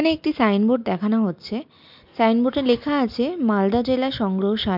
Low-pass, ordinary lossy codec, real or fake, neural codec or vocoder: 5.4 kHz; MP3, 48 kbps; real; none